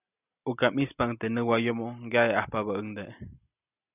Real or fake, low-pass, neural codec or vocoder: real; 3.6 kHz; none